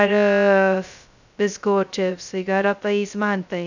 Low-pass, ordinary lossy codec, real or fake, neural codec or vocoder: 7.2 kHz; none; fake; codec, 16 kHz, 0.2 kbps, FocalCodec